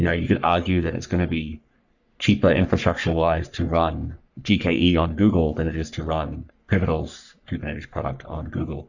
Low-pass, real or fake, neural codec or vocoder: 7.2 kHz; fake; codec, 44.1 kHz, 3.4 kbps, Pupu-Codec